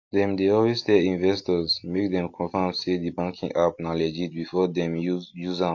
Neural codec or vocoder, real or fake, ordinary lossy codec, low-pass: none; real; AAC, 48 kbps; 7.2 kHz